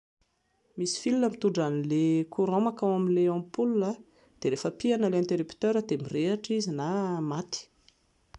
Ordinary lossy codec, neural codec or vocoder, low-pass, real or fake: none; none; 10.8 kHz; real